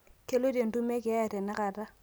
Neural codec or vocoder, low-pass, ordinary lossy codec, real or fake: none; none; none; real